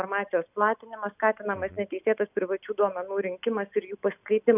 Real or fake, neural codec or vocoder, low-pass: real; none; 3.6 kHz